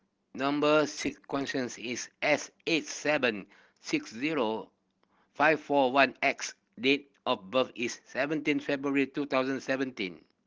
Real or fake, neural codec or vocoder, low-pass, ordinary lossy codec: real; none; 7.2 kHz; Opus, 16 kbps